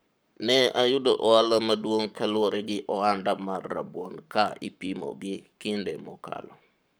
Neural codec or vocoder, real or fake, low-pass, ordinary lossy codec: codec, 44.1 kHz, 7.8 kbps, Pupu-Codec; fake; none; none